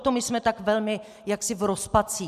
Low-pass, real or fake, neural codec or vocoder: 14.4 kHz; real; none